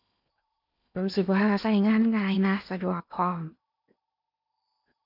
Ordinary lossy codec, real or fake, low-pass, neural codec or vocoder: none; fake; 5.4 kHz; codec, 16 kHz in and 24 kHz out, 0.8 kbps, FocalCodec, streaming, 65536 codes